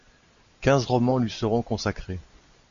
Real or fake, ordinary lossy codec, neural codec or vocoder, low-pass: real; AAC, 64 kbps; none; 7.2 kHz